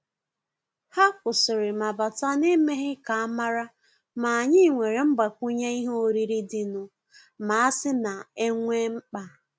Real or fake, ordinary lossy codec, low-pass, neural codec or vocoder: real; none; none; none